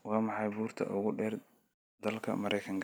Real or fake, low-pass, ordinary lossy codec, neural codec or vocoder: real; none; none; none